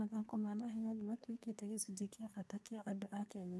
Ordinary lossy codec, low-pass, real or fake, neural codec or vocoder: none; none; fake; codec, 24 kHz, 1 kbps, SNAC